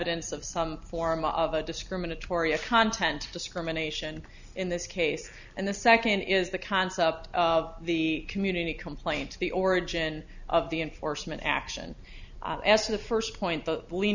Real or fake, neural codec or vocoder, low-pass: real; none; 7.2 kHz